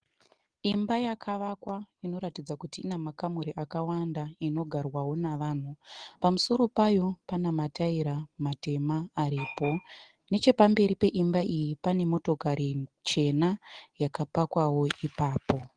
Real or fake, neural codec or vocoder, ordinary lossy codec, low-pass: real; none; Opus, 16 kbps; 9.9 kHz